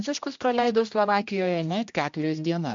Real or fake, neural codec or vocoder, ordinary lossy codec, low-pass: fake; codec, 16 kHz, 1 kbps, X-Codec, HuBERT features, trained on general audio; MP3, 64 kbps; 7.2 kHz